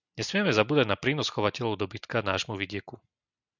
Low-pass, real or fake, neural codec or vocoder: 7.2 kHz; real; none